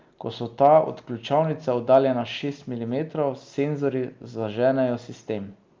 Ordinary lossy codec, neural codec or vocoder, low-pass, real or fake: Opus, 32 kbps; none; 7.2 kHz; real